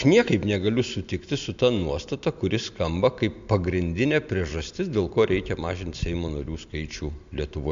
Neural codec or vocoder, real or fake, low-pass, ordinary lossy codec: none; real; 7.2 kHz; MP3, 64 kbps